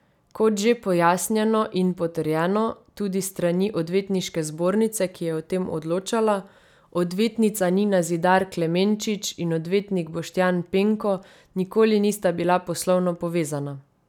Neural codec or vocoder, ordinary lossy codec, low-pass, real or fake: none; none; 19.8 kHz; real